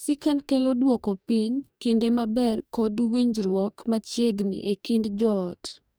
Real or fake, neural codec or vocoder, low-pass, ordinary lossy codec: fake; codec, 44.1 kHz, 2.6 kbps, DAC; none; none